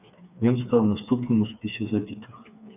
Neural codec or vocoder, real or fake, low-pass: codec, 16 kHz, 4 kbps, FreqCodec, smaller model; fake; 3.6 kHz